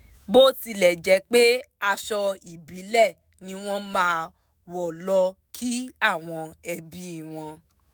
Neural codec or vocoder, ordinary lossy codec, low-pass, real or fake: autoencoder, 48 kHz, 128 numbers a frame, DAC-VAE, trained on Japanese speech; none; none; fake